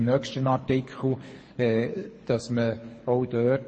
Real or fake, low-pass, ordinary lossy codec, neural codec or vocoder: fake; 9.9 kHz; MP3, 32 kbps; codec, 24 kHz, 6 kbps, HILCodec